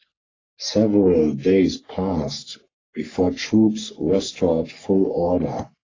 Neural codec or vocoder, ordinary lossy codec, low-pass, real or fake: codec, 44.1 kHz, 3.4 kbps, Pupu-Codec; AAC, 32 kbps; 7.2 kHz; fake